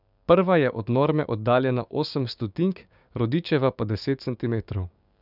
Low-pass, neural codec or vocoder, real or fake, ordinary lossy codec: 5.4 kHz; codec, 16 kHz, 6 kbps, DAC; fake; none